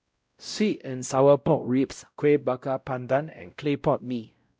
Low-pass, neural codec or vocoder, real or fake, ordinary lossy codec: none; codec, 16 kHz, 0.5 kbps, X-Codec, WavLM features, trained on Multilingual LibriSpeech; fake; none